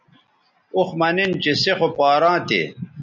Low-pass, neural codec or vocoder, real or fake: 7.2 kHz; none; real